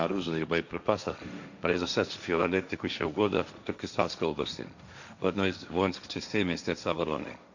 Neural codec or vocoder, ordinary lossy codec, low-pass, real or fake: codec, 16 kHz, 1.1 kbps, Voila-Tokenizer; none; 7.2 kHz; fake